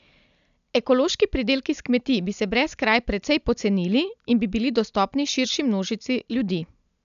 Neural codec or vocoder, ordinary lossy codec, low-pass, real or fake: none; none; 7.2 kHz; real